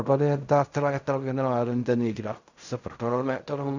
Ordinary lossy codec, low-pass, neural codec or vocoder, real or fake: none; 7.2 kHz; codec, 16 kHz in and 24 kHz out, 0.4 kbps, LongCat-Audio-Codec, fine tuned four codebook decoder; fake